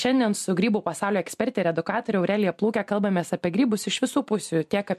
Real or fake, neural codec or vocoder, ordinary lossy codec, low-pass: real; none; MP3, 64 kbps; 14.4 kHz